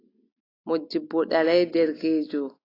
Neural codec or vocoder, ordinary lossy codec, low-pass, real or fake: none; AAC, 24 kbps; 5.4 kHz; real